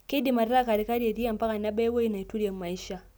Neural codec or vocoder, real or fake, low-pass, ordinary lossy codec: none; real; none; none